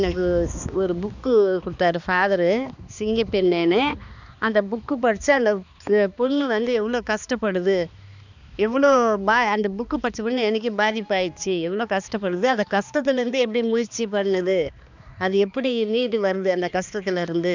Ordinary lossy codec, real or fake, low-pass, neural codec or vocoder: none; fake; 7.2 kHz; codec, 16 kHz, 2 kbps, X-Codec, HuBERT features, trained on balanced general audio